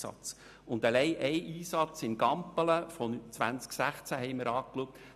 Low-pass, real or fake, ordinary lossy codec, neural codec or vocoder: 14.4 kHz; real; none; none